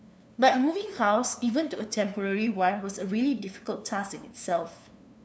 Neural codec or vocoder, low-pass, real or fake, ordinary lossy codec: codec, 16 kHz, 2 kbps, FunCodec, trained on LibriTTS, 25 frames a second; none; fake; none